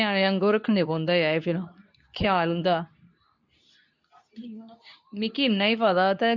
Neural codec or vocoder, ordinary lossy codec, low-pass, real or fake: codec, 24 kHz, 0.9 kbps, WavTokenizer, medium speech release version 2; none; 7.2 kHz; fake